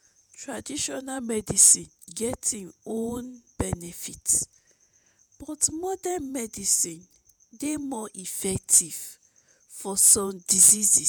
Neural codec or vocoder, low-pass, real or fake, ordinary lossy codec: vocoder, 48 kHz, 128 mel bands, Vocos; none; fake; none